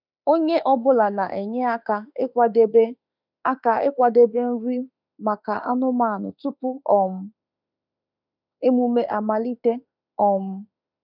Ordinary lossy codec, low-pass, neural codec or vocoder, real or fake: none; 5.4 kHz; autoencoder, 48 kHz, 32 numbers a frame, DAC-VAE, trained on Japanese speech; fake